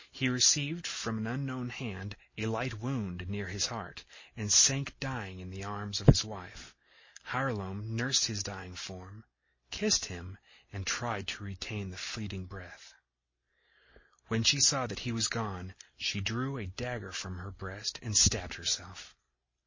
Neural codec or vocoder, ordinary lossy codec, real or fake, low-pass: none; MP3, 32 kbps; real; 7.2 kHz